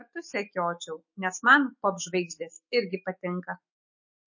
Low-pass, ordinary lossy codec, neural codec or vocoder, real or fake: 7.2 kHz; MP3, 32 kbps; autoencoder, 48 kHz, 128 numbers a frame, DAC-VAE, trained on Japanese speech; fake